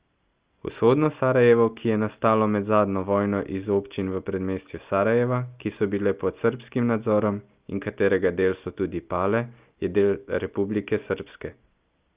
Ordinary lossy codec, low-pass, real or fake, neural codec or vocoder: Opus, 24 kbps; 3.6 kHz; real; none